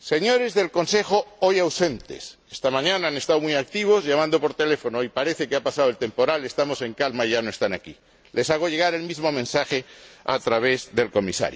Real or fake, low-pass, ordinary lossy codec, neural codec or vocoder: real; none; none; none